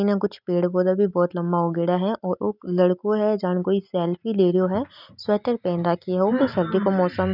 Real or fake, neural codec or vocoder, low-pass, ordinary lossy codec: real; none; 5.4 kHz; none